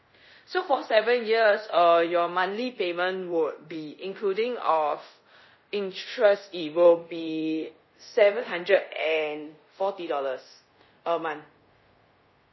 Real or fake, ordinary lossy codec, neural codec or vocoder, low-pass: fake; MP3, 24 kbps; codec, 24 kHz, 0.5 kbps, DualCodec; 7.2 kHz